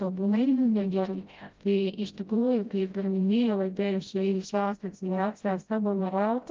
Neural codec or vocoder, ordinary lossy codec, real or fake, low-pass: codec, 16 kHz, 0.5 kbps, FreqCodec, smaller model; Opus, 32 kbps; fake; 7.2 kHz